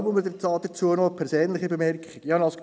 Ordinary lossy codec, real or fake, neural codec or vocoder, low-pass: none; real; none; none